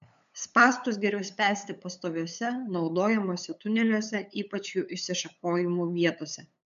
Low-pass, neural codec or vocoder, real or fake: 7.2 kHz; codec, 16 kHz, 8 kbps, FunCodec, trained on LibriTTS, 25 frames a second; fake